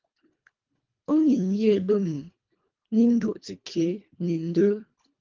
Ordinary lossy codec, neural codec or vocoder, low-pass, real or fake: Opus, 24 kbps; codec, 24 kHz, 1.5 kbps, HILCodec; 7.2 kHz; fake